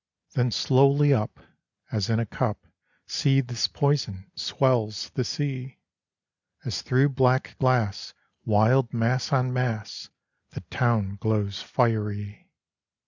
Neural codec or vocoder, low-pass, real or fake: none; 7.2 kHz; real